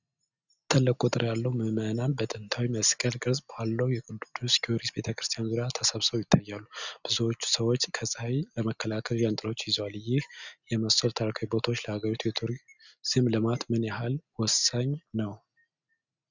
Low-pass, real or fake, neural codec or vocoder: 7.2 kHz; real; none